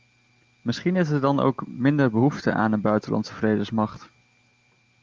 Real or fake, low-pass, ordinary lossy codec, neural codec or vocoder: real; 7.2 kHz; Opus, 32 kbps; none